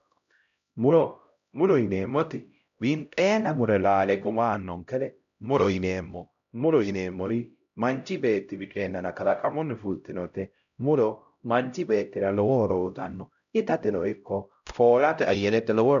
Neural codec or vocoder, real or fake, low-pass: codec, 16 kHz, 0.5 kbps, X-Codec, HuBERT features, trained on LibriSpeech; fake; 7.2 kHz